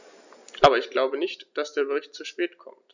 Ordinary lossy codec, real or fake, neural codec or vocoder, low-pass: none; real; none; 7.2 kHz